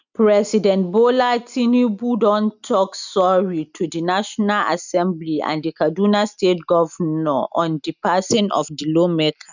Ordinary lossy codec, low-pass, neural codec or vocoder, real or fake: none; 7.2 kHz; none; real